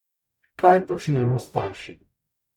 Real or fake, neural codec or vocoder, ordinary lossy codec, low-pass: fake; codec, 44.1 kHz, 0.9 kbps, DAC; none; 19.8 kHz